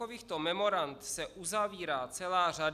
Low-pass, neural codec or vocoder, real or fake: 14.4 kHz; none; real